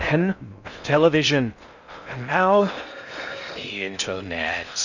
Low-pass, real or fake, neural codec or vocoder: 7.2 kHz; fake; codec, 16 kHz in and 24 kHz out, 0.6 kbps, FocalCodec, streaming, 4096 codes